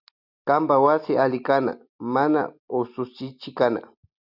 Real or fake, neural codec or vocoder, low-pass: real; none; 5.4 kHz